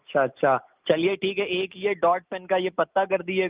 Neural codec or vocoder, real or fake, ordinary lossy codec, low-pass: none; real; Opus, 32 kbps; 3.6 kHz